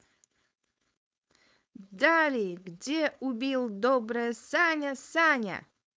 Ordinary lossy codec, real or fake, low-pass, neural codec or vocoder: none; fake; none; codec, 16 kHz, 4.8 kbps, FACodec